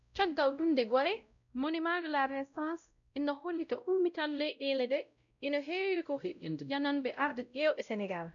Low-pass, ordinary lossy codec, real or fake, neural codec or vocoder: 7.2 kHz; none; fake; codec, 16 kHz, 0.5 kbps, X-Codec, WavLM features, trained on Multilingual LibriSpeech